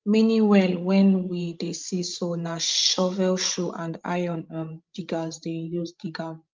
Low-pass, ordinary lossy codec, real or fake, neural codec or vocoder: 7.2 kHz; Opus, 24 kbps; fake; codec, 16 kHz, 16 kbps, FreqCodec, larger model